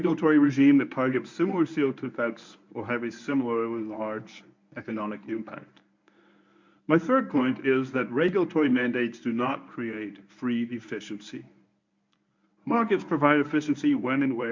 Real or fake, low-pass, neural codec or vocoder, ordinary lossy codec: fake; 7.2 kHz; codec, 24 kHz, 0.9 kbps, WavTokenizer, medium speech release version 1; MP3, 64 kbps